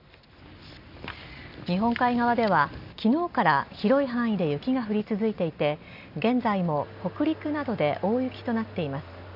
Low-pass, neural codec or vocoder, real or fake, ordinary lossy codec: 5.4 kHz; none; real; none